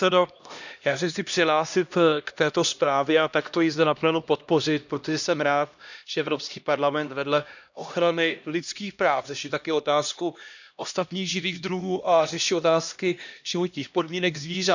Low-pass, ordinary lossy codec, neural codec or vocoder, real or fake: 7.2 kHz; none; codec, 16 kHz, 1 kbps, X-Codec, HuBERT features, trained on LibriSpeech; fake